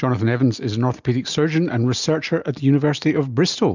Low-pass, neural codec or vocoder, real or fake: 7.2 kHz; none; real